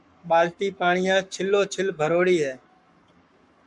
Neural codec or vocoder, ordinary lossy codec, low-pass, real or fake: codec, 44.1 kHz, 7.8 kbps, Pupu-Codec; MP3, 96 kbps; 10.8 kHz; fake